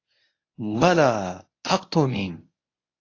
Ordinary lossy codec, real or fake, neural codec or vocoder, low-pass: AAC, 32 kbps; fake; codec, 24 kHz, 0.9 kbps, WavTokenizer, medium speech release version 1; 7.2 kHz